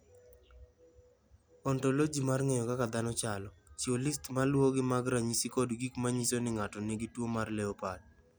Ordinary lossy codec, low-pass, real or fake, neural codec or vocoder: none; none; real; none